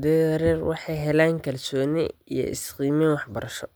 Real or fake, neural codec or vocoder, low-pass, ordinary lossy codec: real; none; none; none